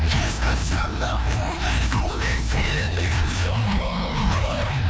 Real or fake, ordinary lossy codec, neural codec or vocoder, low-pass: fake; none; codec, 16 kHz, 1 kbps, FreqCodec, larger model; none